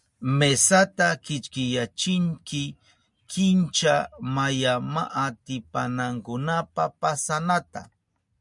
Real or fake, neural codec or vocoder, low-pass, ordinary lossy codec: real; none; 10.8 kHz; MP3, 96 kbps